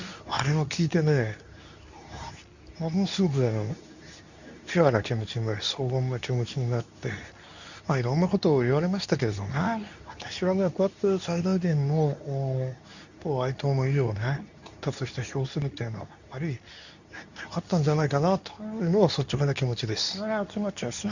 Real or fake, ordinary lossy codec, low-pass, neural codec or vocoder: fake; none; 7.2 kHz; codec, 24 kHz, 0.9 kbps, WavTokenizer, medium speech release version 2